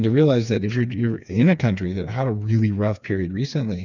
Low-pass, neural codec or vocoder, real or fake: 7.2 kHz; codec, 16 kHz, 4 kbps, FreqCodec, smaller model; fake